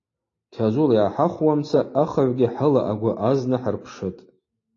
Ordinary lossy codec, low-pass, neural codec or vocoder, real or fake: AAC, 32 kbps; 7.2 kHz; none; real